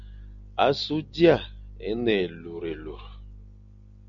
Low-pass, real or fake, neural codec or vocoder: 7.2 kHz; real; none